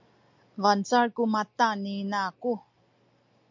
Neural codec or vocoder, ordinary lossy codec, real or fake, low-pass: none; MP3, 48 kbps; real; 7.2 kHz